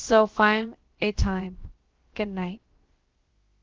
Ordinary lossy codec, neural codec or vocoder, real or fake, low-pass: Opus, 16 kbps; codec, 16 kHz, about 1 kbps, DyCAST, with the encoder's durations; fake; 7.2 kHz